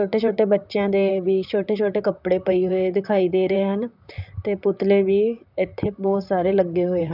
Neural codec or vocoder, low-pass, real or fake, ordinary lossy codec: vocoder, 44.1 kHz, 128 mel bands, Pupu-Vocoder; 5.4 kHz; fake; none